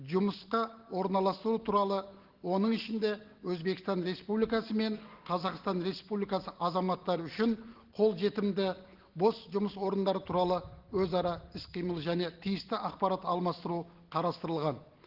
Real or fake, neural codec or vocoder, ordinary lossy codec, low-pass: real; none; Opus, 16 kbps; 5.4 kHz